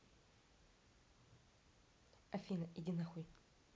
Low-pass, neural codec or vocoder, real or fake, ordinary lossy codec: none; none; real; none